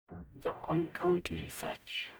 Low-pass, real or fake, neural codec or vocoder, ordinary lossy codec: none; fake; codec, 44.1 kHz, 0.9 kbps, DAC; none